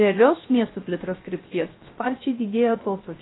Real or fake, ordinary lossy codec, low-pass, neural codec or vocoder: fake; AAC, 16 kbps; 7.2 kHz; codec, 16 kHz, 0.3 kbps, FocalCodec